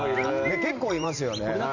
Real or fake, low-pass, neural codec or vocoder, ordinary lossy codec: fake; 7.2 kHz; vocoder, 44.1 kHz, 128 mel bands every 512 samples, BigVGAN v2; AAC, 48 kbps